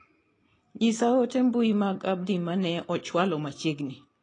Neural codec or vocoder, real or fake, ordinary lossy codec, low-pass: none; real; AAC, 32 kbps; 9.9 kHz